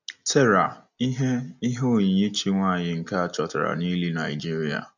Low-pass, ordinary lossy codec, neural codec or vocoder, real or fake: 7.2 kHz; none; none; real